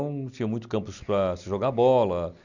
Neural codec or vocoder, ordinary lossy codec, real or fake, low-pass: none; none; real; 7.2 kHz